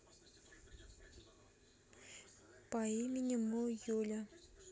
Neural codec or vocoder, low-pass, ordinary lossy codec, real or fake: none; none; none; real